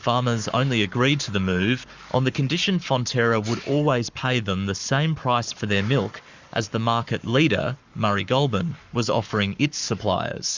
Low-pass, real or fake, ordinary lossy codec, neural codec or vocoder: 7.2 kHz; fake; Opus, 64 kbps; codec, 44.1 kHz, 7.8 kbps, Pupu-Codec